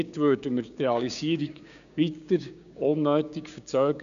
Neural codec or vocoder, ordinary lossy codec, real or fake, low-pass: codec, 16 kHz, 6 kbps, DAC; none; fake; 7.2 kHz